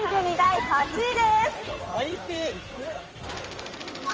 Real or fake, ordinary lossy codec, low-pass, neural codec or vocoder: fake; Opus, 24 kbps; 7.2 kHz; codec, 16 kHz in and 24 kHz out, 2.2 kbps, FireRedTTS-2 codec